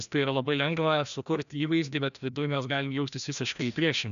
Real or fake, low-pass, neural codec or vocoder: fake; 7.2 kHz; codec, 16 kHz, 1 kbps, FreqCodec, larger model